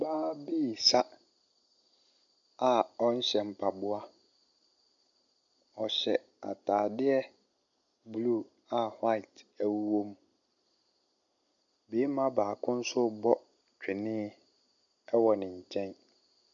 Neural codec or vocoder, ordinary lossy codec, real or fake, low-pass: none; MP3, 64 kbps; real; 7.2 kHz